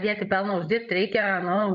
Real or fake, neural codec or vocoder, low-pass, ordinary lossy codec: fake; codec, 16 kHz, 16 kbps, FreqCodec, larger model; 7.2 kHz; MP3, 96 kbps